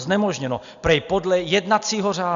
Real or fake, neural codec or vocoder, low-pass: real; none; 7.2 kHz